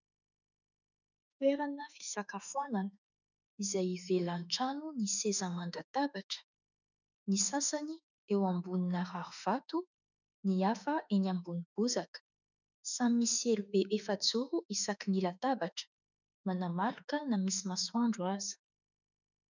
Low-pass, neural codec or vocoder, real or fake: 7.2 kHz; autoencoder, 48 kHz, 32 numbers a frame, DAC-VAE, trained on Japanese speech; fake